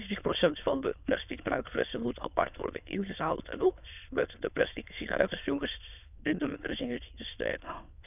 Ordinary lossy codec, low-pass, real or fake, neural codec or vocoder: none; 3.6 kHz; fake; autoencoder, 22.05 kHz, a latent of 192 numbers a frame, VITS, trained on many speakers